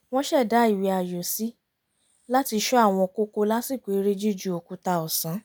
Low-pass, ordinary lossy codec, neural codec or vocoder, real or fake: none; none; none; real